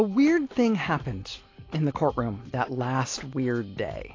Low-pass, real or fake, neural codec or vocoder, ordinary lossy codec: 7.2 kHz; real; none; AAC, 32 kbps